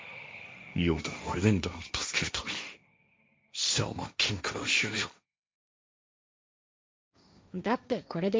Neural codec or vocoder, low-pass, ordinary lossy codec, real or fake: codec, 16 kHz, 1.1 kbps, Voila-Tokenizer; none; none; fake